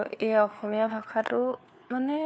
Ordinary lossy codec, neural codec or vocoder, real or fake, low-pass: none; codec, 16 kHz, 4 kbps, FunCodec, trained on LibriTTS, 50 frames a second; fake; none